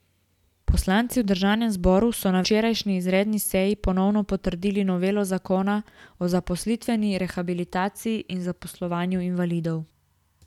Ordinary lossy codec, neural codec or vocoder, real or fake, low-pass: none; none; real; 19.8 kHz